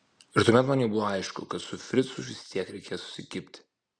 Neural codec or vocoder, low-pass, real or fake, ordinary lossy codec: none; 9.9 kHz; real; Opus, 64 kbps